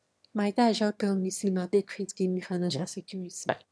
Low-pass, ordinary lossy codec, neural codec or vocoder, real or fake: none; none; autoencoder, 22.05 kHz, a latent of 192 numbers a frame, VITS, trained on one speaker; fake